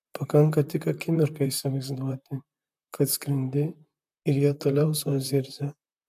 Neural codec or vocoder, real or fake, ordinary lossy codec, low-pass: vocoder, 44.1 kHz, 128 mel bands, Pupu-Vocoder; fake; AAC, 96 kbps; 14.4 kHz